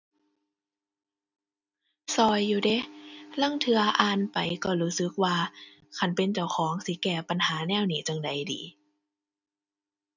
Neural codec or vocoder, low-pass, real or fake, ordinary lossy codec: none; 7.2 kHz; real; none